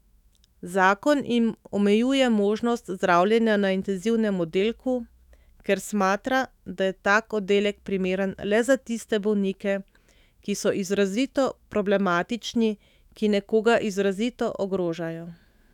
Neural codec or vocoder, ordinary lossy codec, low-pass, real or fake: autoencoder, 48 kHz, 128 numbers a frame, DAC-VAE, trained on Japanese speech; none; 19.8 kHz; fake